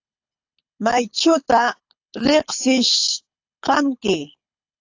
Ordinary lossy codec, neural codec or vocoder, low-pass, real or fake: AAC, 48 kbps; codec, 24 kHz, 6 kbps, HILCodec; 7.2 kHz; fake